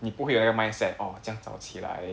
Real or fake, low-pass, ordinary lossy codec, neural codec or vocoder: real; none; none; none